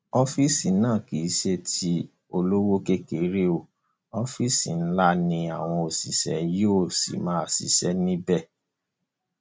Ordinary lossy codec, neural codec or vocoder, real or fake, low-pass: none; none; real; none